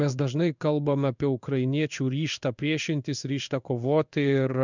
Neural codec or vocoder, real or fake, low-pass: codec, 16 kHz in and 24 kHz out, 1 kbps, XY-Tokenizer; fake; 7.2 kHz